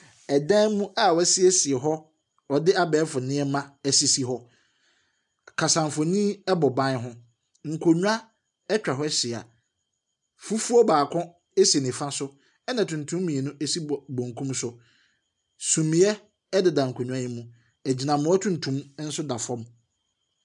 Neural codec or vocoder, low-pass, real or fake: none; 10.8 kHz; real